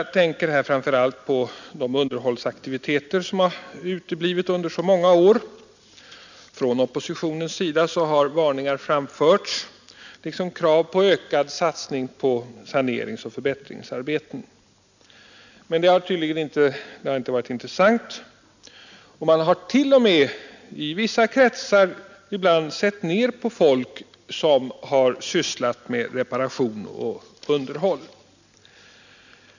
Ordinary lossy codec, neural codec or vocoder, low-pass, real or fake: none; none; 7.2 kHz; real